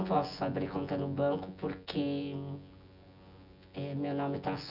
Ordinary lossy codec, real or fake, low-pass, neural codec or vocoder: none; fake; 5.4 kHz; vocoder, 24 kHz, 100 mel bands, Vocos